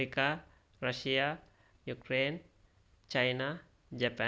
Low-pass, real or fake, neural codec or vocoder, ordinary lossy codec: none; real; none; none